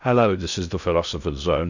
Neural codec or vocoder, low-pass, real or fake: codec, 16 kHz in and 24 kHz out, 0.6 kbps, FocalCodec, streaming, 2048 codes; 7.2 kHz; fake